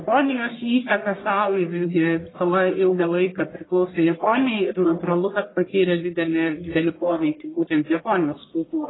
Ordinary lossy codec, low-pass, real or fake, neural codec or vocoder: AAC, 16 kbps; 7.2 kHz; fake; codec, 44.1 kHz, 1.7 kbps, Pupu-Codec